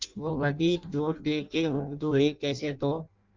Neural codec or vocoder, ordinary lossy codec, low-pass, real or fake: codec, 16 kHz in and 24 kHz out, 0.6 kbps, FireRedTTS-2 codec; Opus, 24 kbps; 7.2 kHz; fake